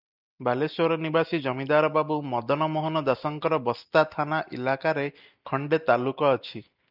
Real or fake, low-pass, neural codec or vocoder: real; 5.4 kHz; none